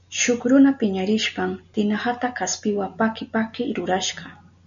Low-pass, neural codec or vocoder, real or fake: 7.2 kHz; none; real